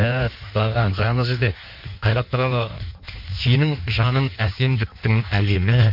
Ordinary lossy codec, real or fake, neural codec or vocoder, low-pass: MP3, 48 kbps; fake; codec, 16 kHz in and 24 kHz out, 1.1 kbps, FireRedTTS-2 codec; 5.4 kHz